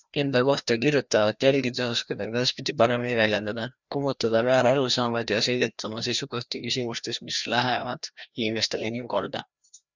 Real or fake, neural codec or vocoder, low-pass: fake; codec, 16 kHz, 1 kbps, FreqCodec, larger model; 7.2 kHz